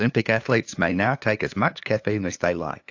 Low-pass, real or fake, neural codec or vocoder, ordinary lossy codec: 7.2 kHz; fake; codec, 16 kHz, 2 kbps, FunCodec, trained on LibriTTS, 25 frames a second; AAC, 48 kbps